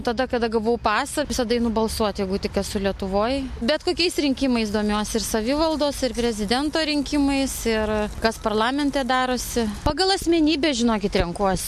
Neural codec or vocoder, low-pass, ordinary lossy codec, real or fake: none; 14.4 kHz; MP3, 64 kbps; real